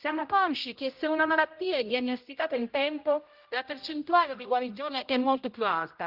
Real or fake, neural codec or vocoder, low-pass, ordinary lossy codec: fake; codec, 16 kHz, 0.5 kbps, X-Codec, HuBERT features, trained on general audio; 5.4 kHz; Opus, 24 kbps